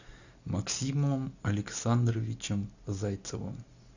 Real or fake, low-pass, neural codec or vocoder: real; 7.2 kHz; none